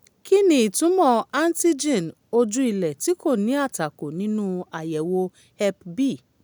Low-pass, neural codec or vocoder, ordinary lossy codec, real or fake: none; none; none; real